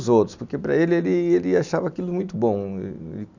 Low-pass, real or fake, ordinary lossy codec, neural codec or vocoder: 7.2 kHz; real; none; none